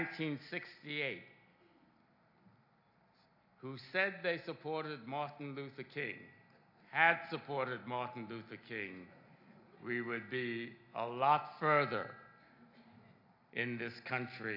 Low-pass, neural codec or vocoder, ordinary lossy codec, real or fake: 5.4 kHz; none; AAC, 48 kbps; real